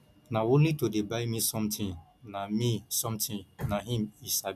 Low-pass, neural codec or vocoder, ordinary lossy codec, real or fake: 14.4 kHz; none; none; real